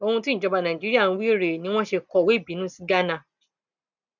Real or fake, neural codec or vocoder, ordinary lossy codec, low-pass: real; none; none; 7.2 kHz